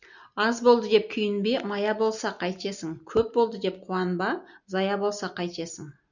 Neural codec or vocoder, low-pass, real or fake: none; 7.2 kHz; real